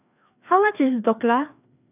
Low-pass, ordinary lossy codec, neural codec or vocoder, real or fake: 3.6 kHz; none; codec, 16 kHz, 2 kbps, FreqCodec, larger model; fake